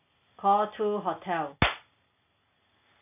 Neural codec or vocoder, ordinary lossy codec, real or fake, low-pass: none; none; real; 3.6 kHz